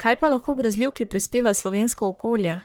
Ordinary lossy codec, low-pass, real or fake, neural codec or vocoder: none; none; fake; codec, 44.1 kHz, 1.7 kbps, Pupu-Codec